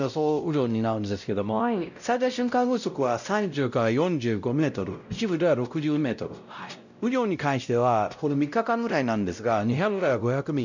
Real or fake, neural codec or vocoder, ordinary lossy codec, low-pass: fake; codec, 16 kHz, 0.5 kbps, X-Codec, WavLM features, trained on Multilingual LibriSpeech; none; 7.2 kHz